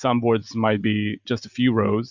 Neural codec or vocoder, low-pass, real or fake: none; 7.2 kHz; real